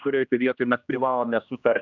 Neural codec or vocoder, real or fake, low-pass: codec, 16 kHz, 1 kbps, X-Codec, HuBERT features, trained on general audio; fake; 7.2 kHz